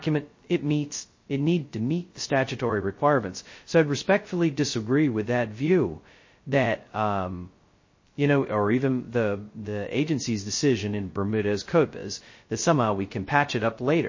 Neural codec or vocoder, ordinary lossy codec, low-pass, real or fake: codec, 16 kHz, 0.2 kbps, FocalCodec; MP3, 32 kbps; 7.2 kHz; fake